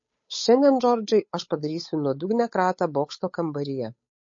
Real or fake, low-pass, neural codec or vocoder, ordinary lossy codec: fake; 7.2 kHz; codec, 16 kHz, 8 kbps, FunCodec, trained on Chinese and English, 25 frames a second; MP3, 32 kbps